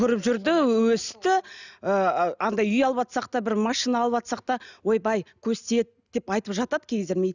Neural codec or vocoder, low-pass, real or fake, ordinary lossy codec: none; 7.2 kHz; real; none